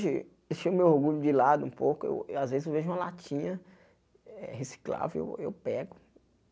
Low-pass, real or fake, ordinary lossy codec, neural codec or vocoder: none; real; none; none